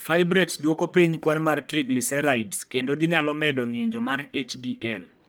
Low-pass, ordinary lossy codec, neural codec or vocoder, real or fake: none; none; codec, 44.1 kHz, 1.7 kbps, Pupu-Codec; fake